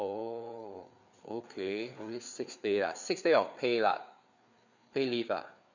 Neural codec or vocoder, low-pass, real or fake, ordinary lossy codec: codec, 16 kHz, 4 kbps, FreqCodec, larger model; 7.2 kHz; fake; none